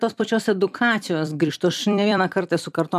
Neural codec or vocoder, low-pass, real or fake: vocoder, 44.1 kHz, 128 mel bands every 256 samples, BigVGAN v2; 14.4 kHz; fake